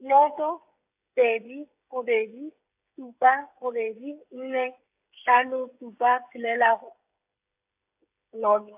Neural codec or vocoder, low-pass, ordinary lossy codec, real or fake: codec, 16 kHz, 16 kbps, FreqCodec, smaller model; 3.6 kHz; AAC, 32 kbps; fake